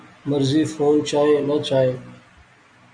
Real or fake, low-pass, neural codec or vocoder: real; 9.9 kHz; none